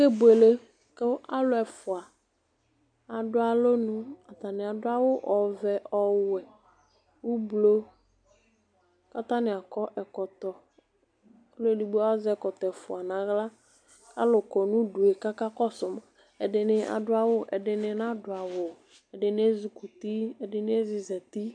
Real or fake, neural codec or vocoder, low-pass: real; none; 9.9 kHz